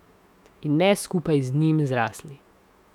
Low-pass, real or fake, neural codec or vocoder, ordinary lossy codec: 19.8 kHz; fake; autoencoder, 48 kHz, 128 numbers a frame, DAC-VAE, trained on Japanese speech; none